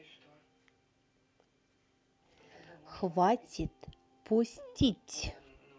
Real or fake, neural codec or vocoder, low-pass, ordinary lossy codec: real; none; none; none